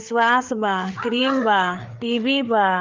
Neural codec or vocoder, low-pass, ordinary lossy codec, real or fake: codec, 16 kHz, 4 kbps, FreqCodec, larger model; 7.2 kHz; Opus, 32 kbps; fake